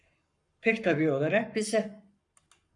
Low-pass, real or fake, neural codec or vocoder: 10.8 kHz; fake; codec, 44.1 kHz, 7.8 kbps, Pupu-Codec